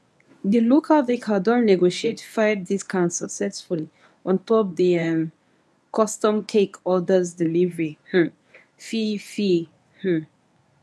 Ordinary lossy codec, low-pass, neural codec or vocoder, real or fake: none; none; codec, 24 kHz, 0.9 kbps, WavTokenizer, medium speech release version 1; fake